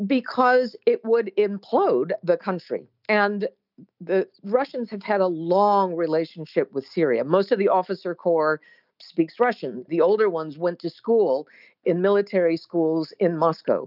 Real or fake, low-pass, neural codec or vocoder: real; 5.4 kHz; none